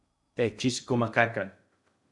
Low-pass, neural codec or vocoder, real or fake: 10.8 kHz; codec, 16 kHz in and 24 kHz out, 0.6 kbps, FocalCodec, streaming, 2048 codes; fake